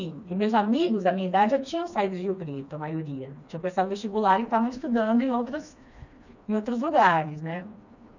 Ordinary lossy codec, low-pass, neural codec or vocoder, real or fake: none; 7.2 kHz; codec, 16 kHz, 2 kbps, FreqCodec, smaller model; fake